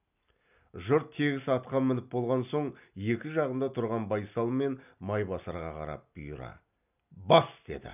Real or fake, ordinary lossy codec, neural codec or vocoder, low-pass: real; none; none; 3.6 kHz